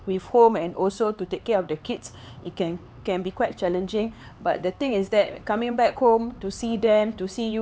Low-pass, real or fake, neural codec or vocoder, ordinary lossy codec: none; fake; codec, 16 kHz, 4 kbps, X-Codec, HuBERT features, trained on LibriSpeech; none